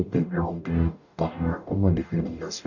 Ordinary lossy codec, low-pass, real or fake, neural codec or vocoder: none; 7.2 kHz; fake; codec, 44.1 kHz, 0.9 kbps, DAC